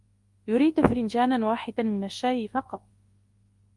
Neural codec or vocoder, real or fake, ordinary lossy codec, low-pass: codec, 24 kHz, 0.9 kbps, WavTokenizer, large speech release; fake; Opus, 24 kbps; 10.8 kHz